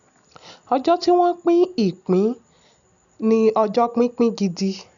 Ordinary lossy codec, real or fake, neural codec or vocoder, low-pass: none; real; none; 7.2 kHz